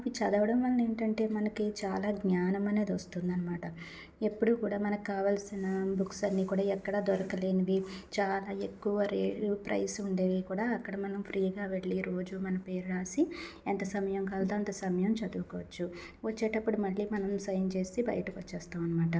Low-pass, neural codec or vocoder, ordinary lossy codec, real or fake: none; none; none; real